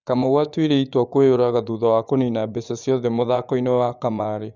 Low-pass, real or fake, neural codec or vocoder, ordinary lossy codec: 7.2 kHz; fake; vocoder, 24 kHz, 100 mel bands, Vocos; Opus, 64 kbps